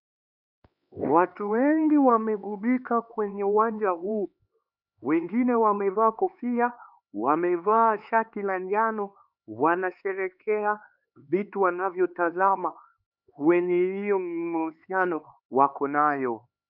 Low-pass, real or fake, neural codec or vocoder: 5.4 kHz; fake; codec, 16 kHz, 4 kbps, X-Codec, HuBERT features, trained on LibriSpeech